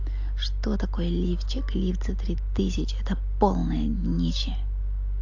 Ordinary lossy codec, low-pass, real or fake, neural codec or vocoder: AAC, 48 kbps; 7.2 kHz; real; none